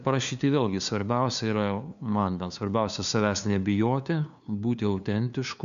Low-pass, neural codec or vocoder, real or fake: 7.2 kHz; codec, 16 kHz, 2 kbps, FunCodec, trained on LibriTTS, 25 frames a second; fake